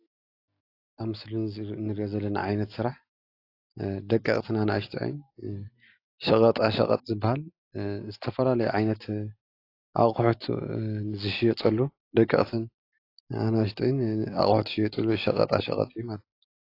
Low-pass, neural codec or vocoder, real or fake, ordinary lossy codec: 5.4 kHz; none; real; AAC, 32 kbps